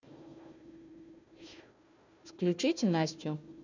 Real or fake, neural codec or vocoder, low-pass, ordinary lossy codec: fake; codec, 16 kHz, 1 kbps, FunCodec, trained on Chinese and English, 50 frames a second; 7.2 kHz; none